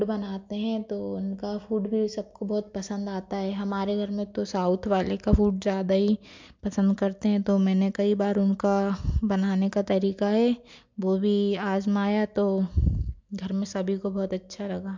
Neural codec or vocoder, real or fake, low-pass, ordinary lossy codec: none; real; 7.2 kHz; MP3, 64 kbps